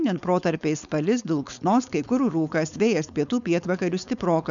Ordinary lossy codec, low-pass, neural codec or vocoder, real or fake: MP3, 96 kbps; 7.2 kHz; codec, 16 kHz, 4.8 kbps, FACodec; fake